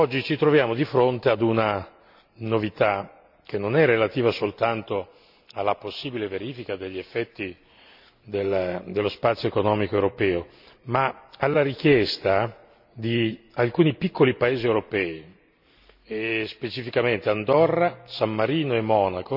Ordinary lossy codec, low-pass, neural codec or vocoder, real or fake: none; 5.4 kHz; none; real